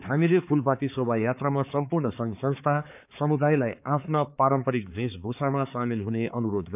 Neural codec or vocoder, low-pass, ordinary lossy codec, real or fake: codec, 16 kHz, 4 kbps, X-Codec, HuBERT features, trained on balanced general audio; 3.6 kHz; none; fake